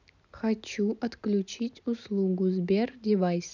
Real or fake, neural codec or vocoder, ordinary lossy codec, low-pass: real; none; none; 7.2 kHz